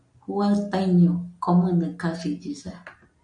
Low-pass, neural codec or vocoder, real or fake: 9.9 kHz; none; real